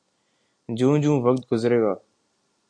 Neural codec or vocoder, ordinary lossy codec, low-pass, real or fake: none; AAC, 48 kbps; 9.9 kHz; real